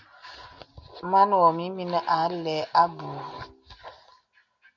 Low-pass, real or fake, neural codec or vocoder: 7.2 kHz; real; none